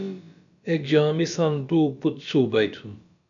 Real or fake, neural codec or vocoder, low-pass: fake; codec, 16 kHz, about 1 kbps, DyCAST, with the encoder's durations; 7.2 kHz